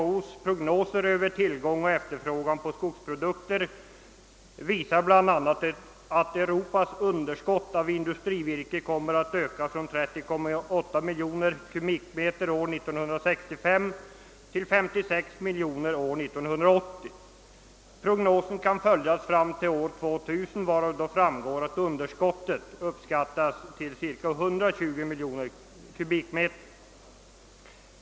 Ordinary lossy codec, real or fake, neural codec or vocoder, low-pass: none; real; none; none